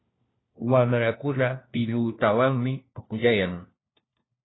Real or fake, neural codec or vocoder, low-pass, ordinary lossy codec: fake; codec, 16 kHz, 1 kbps, FunCodec, trained on LibriTTS, 50 frames a second; 7.2 kHz; AAC, 16 kbps